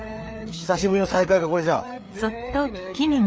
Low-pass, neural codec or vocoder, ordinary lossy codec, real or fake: none; codec, 16 kHz, 4 kbps, FreqCodec, larger model; none; fake